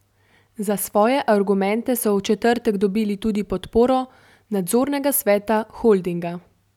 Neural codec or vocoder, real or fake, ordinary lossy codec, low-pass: none; real; none; 19.8 kHz